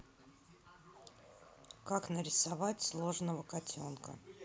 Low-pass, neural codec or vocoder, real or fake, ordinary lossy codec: none; none; real; none